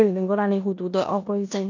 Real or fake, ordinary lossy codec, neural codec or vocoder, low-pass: fake; none; codec, 16 kHz in and 24 kHz out, 0.9 kbps, LongCat-Audio-Codec, four codebook decoder; 7.2 kHz